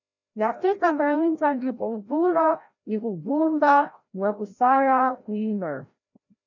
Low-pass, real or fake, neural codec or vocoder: 7.2 kHz; fake; codec, 16 kHz, 0.5 kbps, FreqCodec, larger model